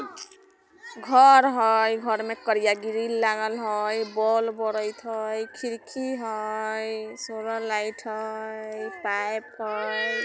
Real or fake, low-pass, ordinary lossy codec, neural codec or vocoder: real; none; none; none